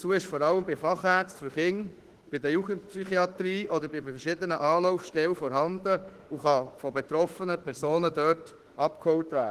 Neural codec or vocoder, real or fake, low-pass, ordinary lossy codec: autoencoder, 48 kHz, 32 numbers a frame, DAC-VAE, trained on Japanese speech; fake; 14.4 kHz; Opus, 16 kbps